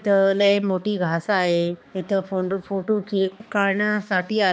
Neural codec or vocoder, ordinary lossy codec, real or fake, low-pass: codec, 16 kHz, 2 kbps, X-Codec, HuBERT features, trained on balanced general audio; none; fake; none